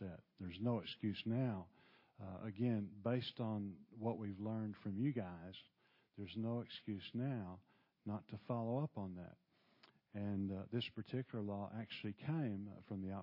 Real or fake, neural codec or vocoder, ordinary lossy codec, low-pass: real; none; MP3, 24 kbps; 5.4 kHz